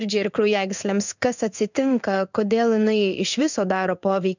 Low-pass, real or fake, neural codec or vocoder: 7.2 kHz; fake; codec, 16 kHz in and 24 kHz out, 1 kbps, XY-Tokenizer